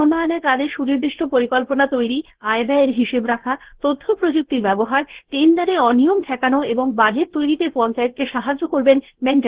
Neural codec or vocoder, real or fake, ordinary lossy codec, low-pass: codec, 16 kHz, 0.7 kbps, FocalCodec; fake; Opus, 16 kbps; 3.6 kHz